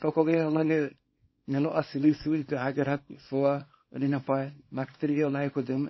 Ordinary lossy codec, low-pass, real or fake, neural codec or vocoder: MP3, 24 kbps; 7.2 kHz; fake; codec, 24 kHz, 0.9 kbps, WavTokenizer, small release